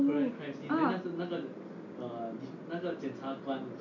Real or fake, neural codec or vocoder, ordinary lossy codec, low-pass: real; none; none; 7.2 kHz